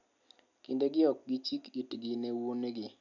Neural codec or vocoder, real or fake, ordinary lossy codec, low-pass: none; real; none; 7.2 kHz